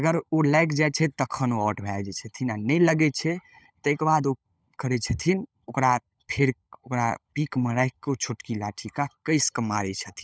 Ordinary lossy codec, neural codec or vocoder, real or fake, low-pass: none; codec, 16 kHz, 16 kbps, FunCodec, trained on LibriTTS, 50 frames a second; fake; none